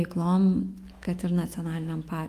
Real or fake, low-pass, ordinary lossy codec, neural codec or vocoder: fake; 14.4 kHz; Opus, 32 kbps; autoencoder, 48 kHz, 128 numbers a frame, DAC-VAE, trained on Japanese speech